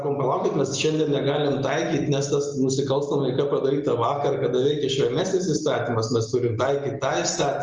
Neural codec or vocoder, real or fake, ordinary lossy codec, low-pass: none; real; Opus, 24 kbps; 7.2 kHz